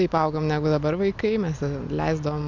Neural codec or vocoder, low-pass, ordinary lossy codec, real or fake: none; 7.2 kHz; MP3, 64 kbps; real